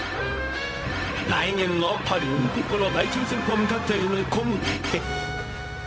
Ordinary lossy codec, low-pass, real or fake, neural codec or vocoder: none; none; fake; codec, 16 kHz, 0.4 kbps, LongCat-Audio-Codec